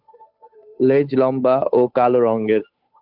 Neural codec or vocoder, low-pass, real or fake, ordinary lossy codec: codec, 16 kHz, 8 kbps, FunCodec, trained on Chinese and English, 25 frames a second; 5.4 kHz; fake; AAC, 48 kbps